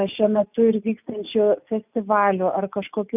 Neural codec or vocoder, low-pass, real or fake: none; 3.6 kHz; real